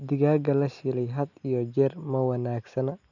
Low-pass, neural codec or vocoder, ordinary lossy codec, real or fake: 7.2 kHz; none; none; real